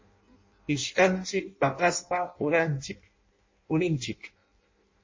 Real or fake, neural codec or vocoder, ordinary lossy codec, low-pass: fake; codec, 16 kHz in and 24 kHz out, 0.6 kbps, FireRedTTS-2 codec; MP3, 32 kbps; 7.2 kHz